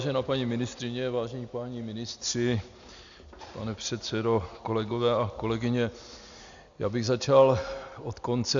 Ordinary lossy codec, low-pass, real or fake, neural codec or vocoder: Opus, 64 kbps; 7.2 kHz; real; none